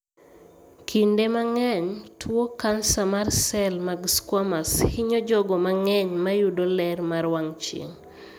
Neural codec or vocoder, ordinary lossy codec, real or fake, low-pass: none; none; real; none